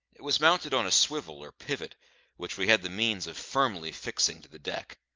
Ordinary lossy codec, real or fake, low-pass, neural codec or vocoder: Opus, 24 kbps; real; 7.2 kHz; none